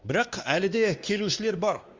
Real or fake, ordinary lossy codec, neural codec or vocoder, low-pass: fake; Opus, 32 kbps; codec, 16 kHz, 2 kbps, X-Codec, WavLM features, trained on Multilingual LibriSpeech; 7.2 kHz